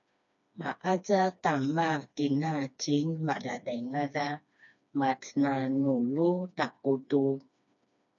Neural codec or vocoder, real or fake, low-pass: codec, 16 kHz, 2 kbps, FreqCodec, smaller model; fake; 7.2 kHz